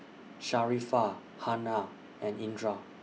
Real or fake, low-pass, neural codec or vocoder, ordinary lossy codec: real; none; none; none